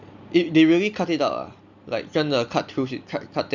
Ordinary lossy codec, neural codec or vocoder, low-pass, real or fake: Opus, 64 kbps; none; 7.2 kHz; real